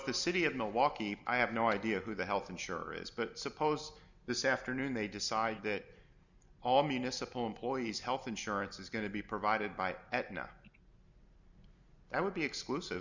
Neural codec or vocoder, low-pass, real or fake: none; 7.2 kHz; real